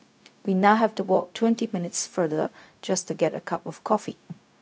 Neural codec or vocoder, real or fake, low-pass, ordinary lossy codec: codec, 16 kHz, 0.4 kbps, LongCat-Audio-Codec; fake; none; none